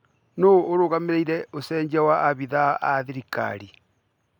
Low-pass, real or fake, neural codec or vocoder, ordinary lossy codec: 19.8 kHz; real; none; none